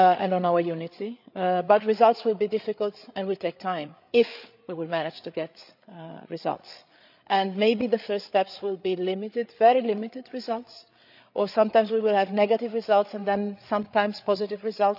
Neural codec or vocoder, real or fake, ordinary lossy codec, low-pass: codec, 16 kHz, 8 kbps, FreqCodec, larger model; fake; none; 5.4 kHz